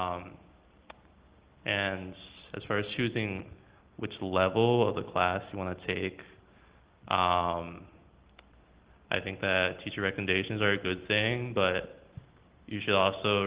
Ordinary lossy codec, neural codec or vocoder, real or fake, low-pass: Opus, 16 kbps; none; real; 3.6 kHz